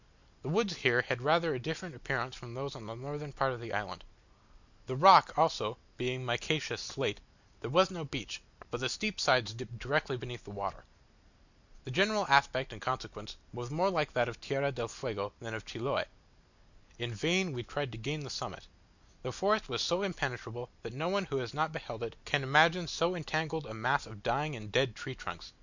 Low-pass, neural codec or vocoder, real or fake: 7.2 kHz; none; real